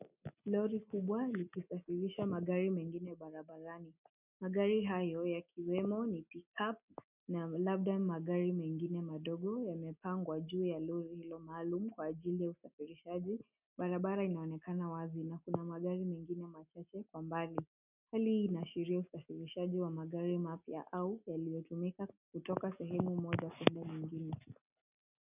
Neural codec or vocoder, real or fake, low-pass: none; real; 3.6 kHz